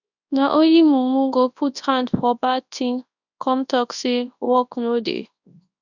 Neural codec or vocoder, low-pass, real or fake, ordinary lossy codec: codec, 24 kHz, 0.9 kbps, WavTokenizer, large speech release; 7.2 kHz; fake; none